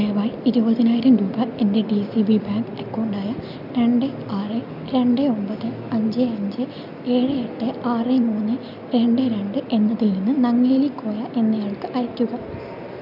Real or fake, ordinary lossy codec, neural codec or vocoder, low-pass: real; none; none; 5.4 kHz